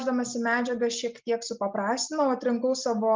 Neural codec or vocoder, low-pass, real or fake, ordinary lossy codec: none; 7.2 kHz; real; Opus, 24 kbps